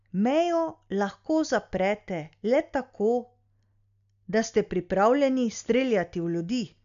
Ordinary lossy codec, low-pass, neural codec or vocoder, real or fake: none; 7.2 kHz; none; real